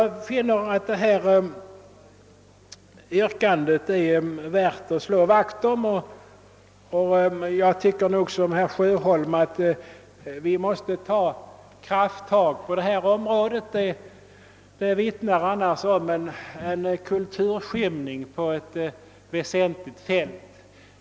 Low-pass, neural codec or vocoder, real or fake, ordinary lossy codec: none; none; real; none